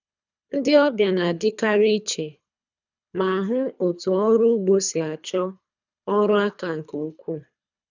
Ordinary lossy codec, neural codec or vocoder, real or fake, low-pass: none; codec, 24 kHz, 3 kbps, HILCodec; fake; 7.2 kHz